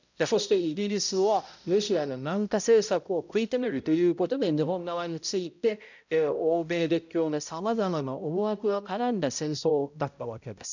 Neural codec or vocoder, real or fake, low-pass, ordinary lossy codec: codec, 16 kHz, 0.5 kbps, X-Codec, HuBERT features, trained on balanced general audio; fake; 7.2 kHz; none